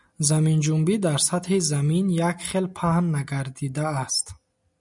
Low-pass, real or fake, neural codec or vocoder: 10.8 kHz; real; none